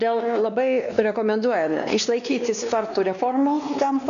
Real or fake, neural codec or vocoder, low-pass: fake; codec, 16 kHz, 2 kbps, X-Codec, WavLM features, trained on Multilingual LibriSpeech; 7.2 kHz